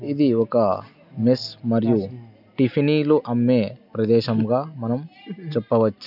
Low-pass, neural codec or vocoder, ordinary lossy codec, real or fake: 5.4 kHz; none; none; real